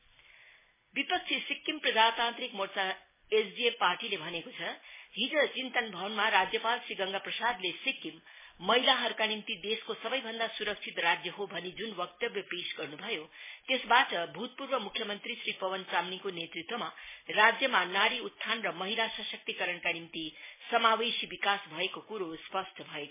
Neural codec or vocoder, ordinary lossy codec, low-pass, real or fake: none; MP3, 16 kbps; 3.6 kHz; real